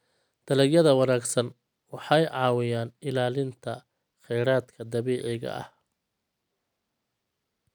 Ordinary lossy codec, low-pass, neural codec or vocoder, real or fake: none; none; none; real